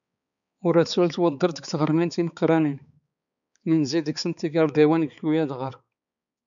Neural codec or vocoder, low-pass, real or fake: codec, 16 kHz, 4 kbps, X-Codec, HuBERT features, trained on balanced general audio; 7.2 kHz; fake